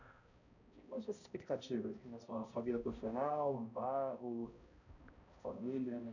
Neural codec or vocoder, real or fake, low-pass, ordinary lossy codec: codec, 16 kHz, 0.5 kbps, X-Codec, HuBERT features, trained on balanced general audio; fake; 7.2 kHz; none